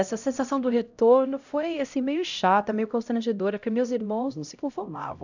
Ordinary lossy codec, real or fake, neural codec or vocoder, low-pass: none; fake; codec, 16 kHz, 0.5 kbps, X-Codec, HuBERT features, trained on LibriSpeech; 7.2 kHz